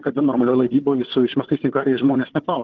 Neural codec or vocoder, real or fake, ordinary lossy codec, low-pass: codec, 16 kHz, 4 kbps, FunCodec, trained on Chinese and English, 50 frames a second; fake; Opus, 16 kbps; 7.2 kHz